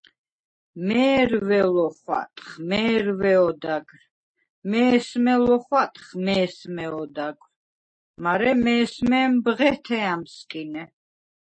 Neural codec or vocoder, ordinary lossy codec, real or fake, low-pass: none; MP3, 32 kbps; real; 9.9 kHz